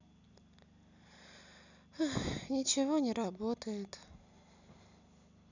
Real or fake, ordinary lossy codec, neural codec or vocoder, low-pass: real; none; none; 7.2 kHz